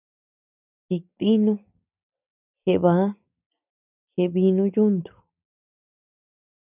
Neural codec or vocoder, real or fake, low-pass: none; real; 3.6 kHz